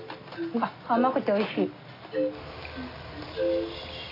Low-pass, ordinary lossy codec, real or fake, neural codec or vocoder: 5.4 kHz; AAC, 24 kbps; real; none